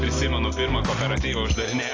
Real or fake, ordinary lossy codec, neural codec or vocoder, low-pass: real; AAC, 32 kbps; none; 7.2 kHz